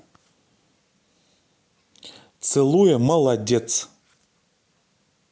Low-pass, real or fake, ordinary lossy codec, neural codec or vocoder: none; real; none; none